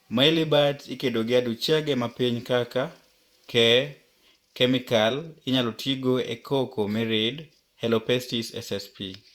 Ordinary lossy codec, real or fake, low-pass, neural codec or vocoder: Opus, 64 kbps; fake; 19.8 kHz; vocoder, 48 kHz, 128 mel bands, Vocos